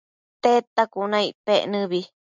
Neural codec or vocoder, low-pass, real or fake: none; 7.2 kHz; real